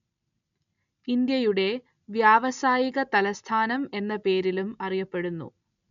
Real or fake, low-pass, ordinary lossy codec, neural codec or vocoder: real; 7.2 kHz; none; none